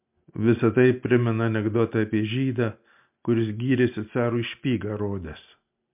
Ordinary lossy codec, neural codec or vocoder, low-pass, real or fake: MP3, 32 kbps; none; 3.6 kHz; real